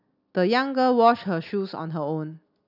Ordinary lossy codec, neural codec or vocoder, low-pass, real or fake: none; none; 5.4 kHz; real